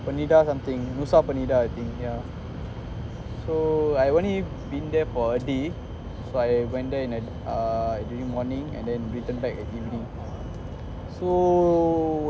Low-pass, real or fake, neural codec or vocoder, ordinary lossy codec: none; real; none; none